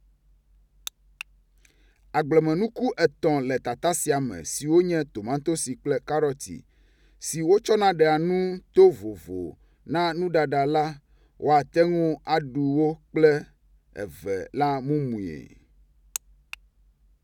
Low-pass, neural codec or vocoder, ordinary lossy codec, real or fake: 19.8 kHz; none; none; real